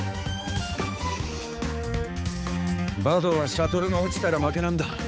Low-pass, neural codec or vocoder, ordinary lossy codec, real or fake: none; codec, 16 kHz, 4 kbps, X-Codec, HuBERT features, trained on balanced general audio; none; fake